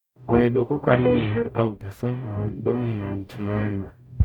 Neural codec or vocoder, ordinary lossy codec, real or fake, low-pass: codec, 44.1 kHz, 0.9 kbps, DAC; none; fake; 19.8 kHz